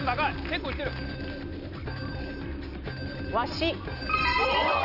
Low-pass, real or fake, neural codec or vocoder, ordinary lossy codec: 5.4 kHz; real; none; none